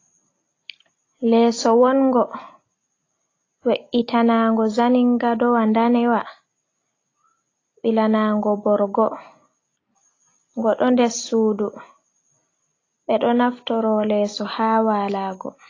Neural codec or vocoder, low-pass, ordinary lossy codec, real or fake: none; 7.2 kHz; AAC, 32 kbps; real